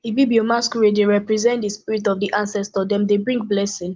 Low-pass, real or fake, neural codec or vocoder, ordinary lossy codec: 7.2 kHz; real; none; Opus, 24 kbps